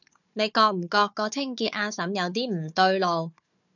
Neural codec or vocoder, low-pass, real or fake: codec, 16 kHz, 4 kbps, FunCodec, trained on Chinese and English, 50 frames a second; 7.2 kHz; fake